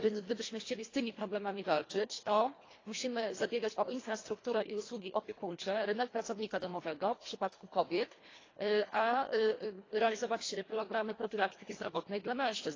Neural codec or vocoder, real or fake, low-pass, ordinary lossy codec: codec, 24 kHz, 1.5 kbps, HILCodec; fake; 7.2 kHz; AAC, 32 kbps